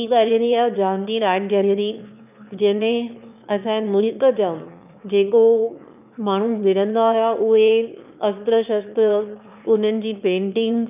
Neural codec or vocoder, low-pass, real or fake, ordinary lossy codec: autoencoder, 22.05 kHz, a latent of 192 numbers a frame, VITS, trained on one speaker; 3.6 kHz; fake; none